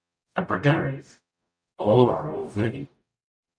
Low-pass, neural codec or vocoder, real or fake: 9.9 kHz; codec, 44.1 kHz, 0.9 kbps, DAC; fake